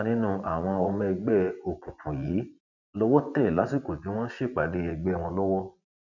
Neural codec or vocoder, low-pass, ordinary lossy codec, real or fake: vocoder, 24 kHz, 100 mel bands, Vocos; 7.2 kHz; none; fake